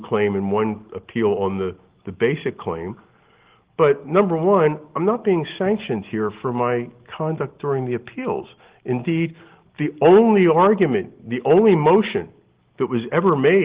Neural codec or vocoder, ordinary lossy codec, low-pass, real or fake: none; Opus, 16 kbps; 3.6 kHz; real